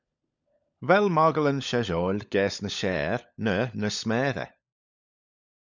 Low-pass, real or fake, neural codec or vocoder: 7.2 kHz; fake; codec, 16 kHz, 16 kbps, FunCodec, trained on LibriTTS, 50 frames a second